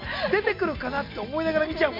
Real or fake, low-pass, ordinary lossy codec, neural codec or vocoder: real; 5.4 kHz; MP3, 48 kbps; none